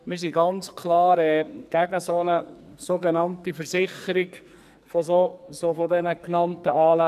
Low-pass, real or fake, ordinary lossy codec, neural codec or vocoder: 14.4 kHz; fake; none; codec, 44.1 kHz, 2.6 kbps, SNAC